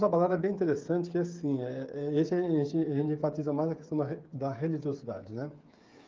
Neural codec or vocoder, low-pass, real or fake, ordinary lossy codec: codec, 16 kHz, 8 kbps, FreqCodec, smaller model; 7.2 kHz; fake; Opus, 32 kbps